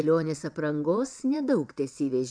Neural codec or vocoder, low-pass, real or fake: vocoder, 22.05 kHz, 80 mel bands, WaveNeXt; 9.9 kHz; fake